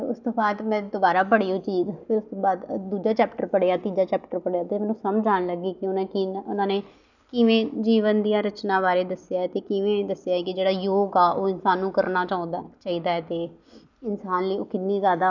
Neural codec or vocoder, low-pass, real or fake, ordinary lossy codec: none; 7.2 kHz; real; none